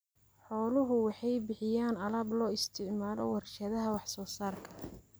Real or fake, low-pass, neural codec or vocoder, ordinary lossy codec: real; none; none; none